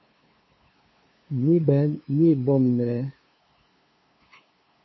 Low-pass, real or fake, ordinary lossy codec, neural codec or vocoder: 7.2 kHz; fake; MP3, 24 kbps; codec, 16 kHz, 2 kbps, FunCodec, trained on LibriTTS, 25 frames a second